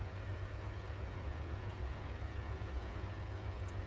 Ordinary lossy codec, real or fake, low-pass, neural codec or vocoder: none; fake; none; codec, 16 kHz, 8 kbps, FreqCodec, smaller model